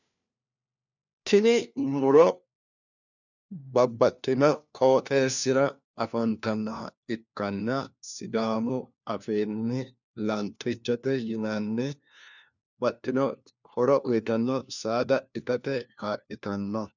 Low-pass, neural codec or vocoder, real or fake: 7.2 kHz; codec, 16 kHz, 1 kbps, FunCodec, trained on LibriTTS, 50 frames a second; fake